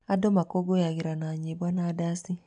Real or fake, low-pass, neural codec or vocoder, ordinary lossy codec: real; 9.9 kHz; none; none